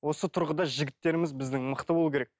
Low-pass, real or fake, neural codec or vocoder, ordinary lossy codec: none; real; none; none